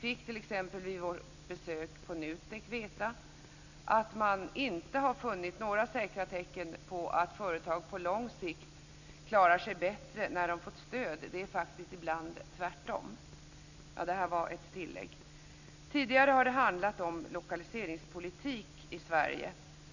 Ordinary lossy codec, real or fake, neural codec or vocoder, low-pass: none; real; none; 7.2 kHz